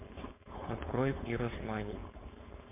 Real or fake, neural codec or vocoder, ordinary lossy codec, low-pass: fake; codec, 16 kHz, 4.8 kbps, FACodec; MP3, 32 kbps; 3.6 kHz